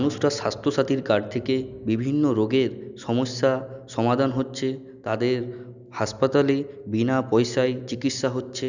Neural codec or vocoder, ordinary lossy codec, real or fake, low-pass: none; none; real; 7.2 kHz